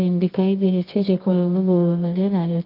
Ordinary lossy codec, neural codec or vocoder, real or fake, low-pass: Opus, 32 kbps; codec, 24 kHz, 0.9 kbps, WavTokenizer, medium music audio release; fake; 5.4 kHz